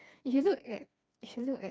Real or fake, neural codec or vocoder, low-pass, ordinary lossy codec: fake; codec, 16 kHz, 2 kbps, FreqCodec, smaller model; none; none